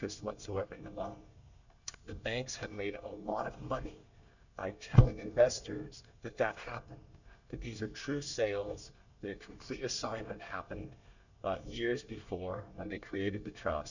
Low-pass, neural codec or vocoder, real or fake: 7.2 kHz; codec, 24 kHz, 1 kbps, SNAC; fake